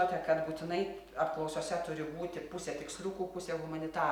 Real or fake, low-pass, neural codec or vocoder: real; 19.8 kHz; none